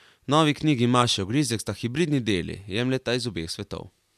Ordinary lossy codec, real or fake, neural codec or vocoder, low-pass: none; real; none; 14.4 kHz